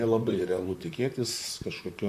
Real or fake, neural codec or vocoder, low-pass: fake; vocoder, 44.1 kHz, 128 mel bands, Pupu-Vocoder; 14.4 kHz